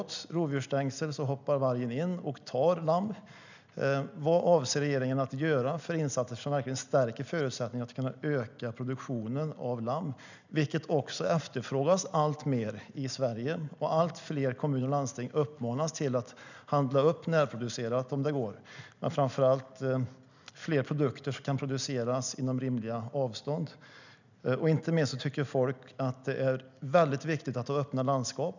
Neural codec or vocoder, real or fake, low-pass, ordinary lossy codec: none; real; 7.2 kHz; none